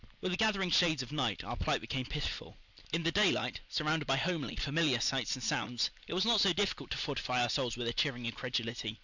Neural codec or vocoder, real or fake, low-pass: none; real; 7.2 kHz